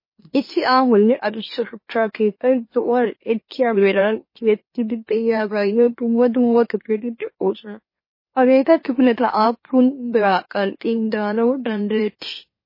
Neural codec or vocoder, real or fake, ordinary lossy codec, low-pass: autoencoder, 44.1 kHz, a latent of 192 numbers a frame, MeloTTS; fake; MP3, 24 kbps; 5.4 kHz